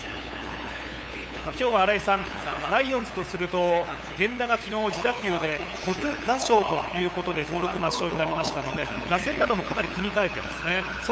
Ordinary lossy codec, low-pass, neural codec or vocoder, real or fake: none; none; codec, 16 kHz, 8 kbps, FunCodec, trained on LibriTTS, 25 frames a second; fake